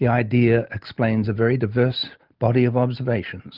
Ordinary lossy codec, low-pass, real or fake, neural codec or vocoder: Opus, 32 kbps; 5.4 kHz; real; none